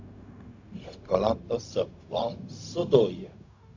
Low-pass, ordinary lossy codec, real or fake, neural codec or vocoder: 7.2 kHz; AAC, 48 kbps; fake; codec, 16 kHz, 0.4 kbps, LongCat-Audio-Codec